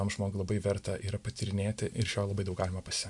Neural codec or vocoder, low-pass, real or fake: none; 10.8 kHz; real